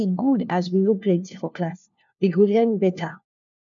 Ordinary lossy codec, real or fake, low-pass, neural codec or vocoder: none; fake; 7.2 kHz; codec, 16 kHz, 1 kbps, FunCodec, trained on LibriTTS, 50 frames a second